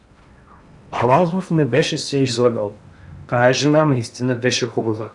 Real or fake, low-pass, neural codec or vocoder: fake; 10.8 kHz; codec, 16 kHz in and 24 kHz out, 0.8 kbps, FocalCodec, streaming, 65536 codes